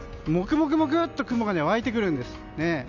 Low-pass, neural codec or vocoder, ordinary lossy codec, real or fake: 7.2 kHz; none; none; real